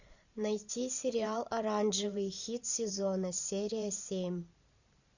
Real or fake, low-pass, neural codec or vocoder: fake; 7.2 kHz; vocoder, 44.1 kHz, 128 mel bands every 512 samples, BigVGAN v2